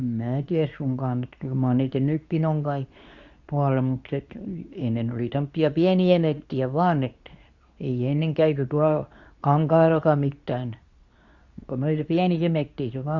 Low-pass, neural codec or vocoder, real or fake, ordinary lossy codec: 7.2 kHz; codec, 24 kHz, 0.9 kbps, WavTokenizer, medium speech release version 2; fake; Opus, 64 kbps